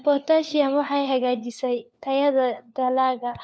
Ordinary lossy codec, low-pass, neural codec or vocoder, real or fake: none; none; codec, 16 kHz, 4 kbps, FunCodec, trained on LibriTTS, 50 frames a second; fake